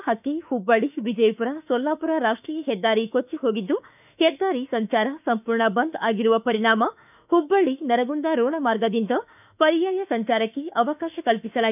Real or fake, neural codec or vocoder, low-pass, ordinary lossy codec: fake; autoencoder, 48 kHz, 32 numbers a frame, DAC-VAE, trained on Japanese speech; 3.6 kHz; none